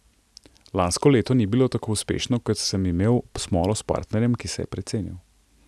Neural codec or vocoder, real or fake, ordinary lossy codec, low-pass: none; real; none; none